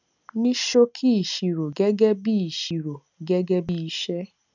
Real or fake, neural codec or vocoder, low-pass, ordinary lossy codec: real; none; 7.2 kHz; none